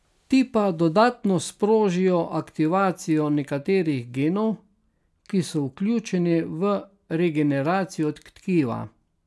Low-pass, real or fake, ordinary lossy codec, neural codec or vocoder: none; real; none; none